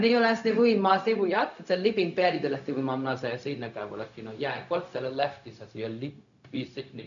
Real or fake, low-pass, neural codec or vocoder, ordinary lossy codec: fake; 7.2 kHz; codec, 16 kHz, 0.4 kbps, LongCat-Audio-Codec; none